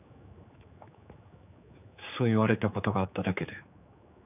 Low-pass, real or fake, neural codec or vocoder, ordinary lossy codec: 3.6 kHz; fake; codec, 16 kHz, 4 kbps, X-Codec, HuBERT features, trained on general audio; none